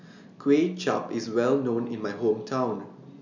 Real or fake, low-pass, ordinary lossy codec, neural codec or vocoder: real; 7.2 kHz; none; none